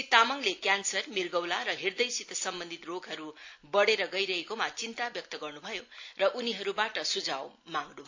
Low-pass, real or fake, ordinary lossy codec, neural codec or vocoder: 7.2 kHz; real; AAC, 48 kbps; none